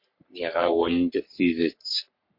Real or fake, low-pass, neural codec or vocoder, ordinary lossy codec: fake; 5.4 kHz; codec, 44.1 kHz, 3.4 kbps, Pupu-Codec; MP3, 32 kbps